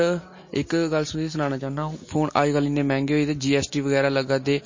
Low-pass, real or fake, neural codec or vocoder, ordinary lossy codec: 7.2 kHz; real; none; MP3, 32 kbps